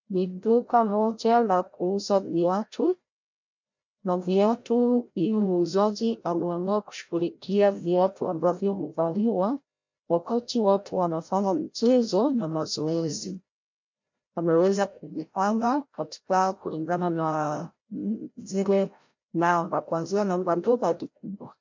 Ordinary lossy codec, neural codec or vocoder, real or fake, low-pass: MP3, 48 kbps; codec, 16 kHz, 0.5 kbps, FreqCodec, larger model; fake; 7.2 kHz